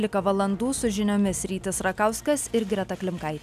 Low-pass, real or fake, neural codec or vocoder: 14.4 kHz; real; none